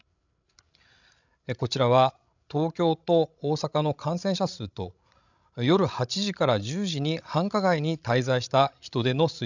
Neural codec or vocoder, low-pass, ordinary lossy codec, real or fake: codec, 16 kHz, 16 kbps, FreqCodec, larger model; 7.2 kHz; none; fake